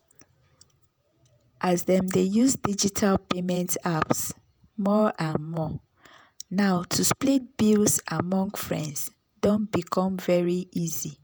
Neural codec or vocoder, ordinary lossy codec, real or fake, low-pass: vocoder, 48 kHz, 128 mel bands, Vocos; none; fake; none